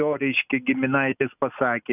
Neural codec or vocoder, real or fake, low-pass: none; real; 3.6 kHz